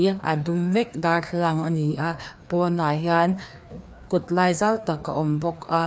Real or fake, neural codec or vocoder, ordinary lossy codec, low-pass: fake; codec, 16 kHz, 2 kbps, FreqCodec, larger model; none; none